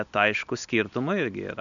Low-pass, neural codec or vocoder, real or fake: 7.2 kHz; none; real